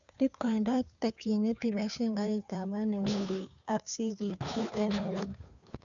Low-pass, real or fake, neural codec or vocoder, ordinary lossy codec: 7.2 kHz; fake; codec, 16 kHz, 2 kbps, FunCodec, trained on Chinese and English, 25 frames a second; none